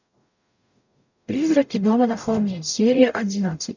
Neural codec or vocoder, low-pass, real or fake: codec, 44.1 kHz, 0.9 kbps, DAC; 7.2 kHz; fake